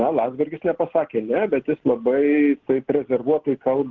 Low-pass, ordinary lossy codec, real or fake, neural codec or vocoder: 7.2 kHz; Opus, 16 kbps; real; none